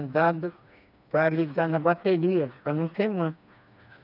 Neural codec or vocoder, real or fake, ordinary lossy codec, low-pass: codec, 16 kHz, 2 kbps, FreqCodec, smaller model; fake; none; 5.4 kHz